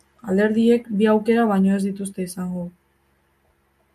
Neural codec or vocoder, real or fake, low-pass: none; real; 14.4 kHz